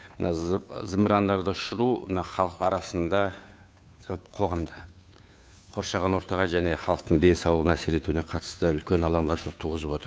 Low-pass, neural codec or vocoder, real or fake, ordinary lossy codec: none; codec, 16 kHz, 2 kbps, FunCodec, trained on Chinese and English, 25 frames a second; fake; none